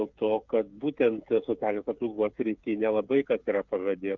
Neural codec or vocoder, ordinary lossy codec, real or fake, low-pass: codec, 16 kHz, 8 kbps, FreqCodec, smaller model; Opus, 64 kbps; fake; 7.2 kHz